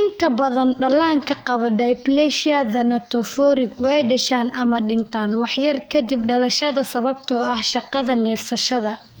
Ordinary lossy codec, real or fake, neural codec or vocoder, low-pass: none; fake; codec, 44.1 kHz, 2.6 kbps, SNAC; none